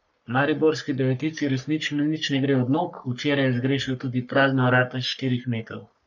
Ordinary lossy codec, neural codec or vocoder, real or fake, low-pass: none; codec, 44.1 kHz, 3.4 kbps, Pupu-Codec; fake; 7.2 kHz